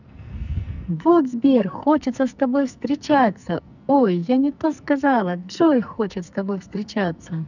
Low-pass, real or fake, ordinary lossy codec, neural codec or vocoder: 7.2 kHz; fake; none; codec, 44.1 kHz, 2.6 kbps, SNAC